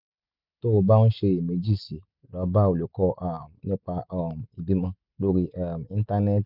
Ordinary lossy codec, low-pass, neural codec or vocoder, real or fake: none; 5.4 kHz; none; real